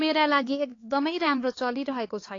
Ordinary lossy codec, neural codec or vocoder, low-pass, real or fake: AAC, 32 kbps; codec, 16 kHz, 4 kbps, X-Codec, HuBERT features, trained on LibriSpeech; 7.2 kHz; fake